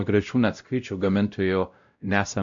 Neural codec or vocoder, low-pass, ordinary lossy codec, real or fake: codec, 16 kHz, 0.5 kbps, X-Codec, WavLM features, trained on Multilingual LibriSpeech; 7.2 kHz; AAC, 64 kbps; fake